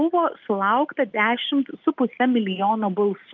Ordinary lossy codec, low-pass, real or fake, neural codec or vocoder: Opus, 32 kbps; 7.2 kHz; real; none